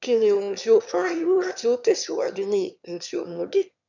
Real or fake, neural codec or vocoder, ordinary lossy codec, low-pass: fake; autoencoder, 22.05 kHz, a latent of 192 numbers a frame, VITS, trained on one speaker; none; 7.2 kHz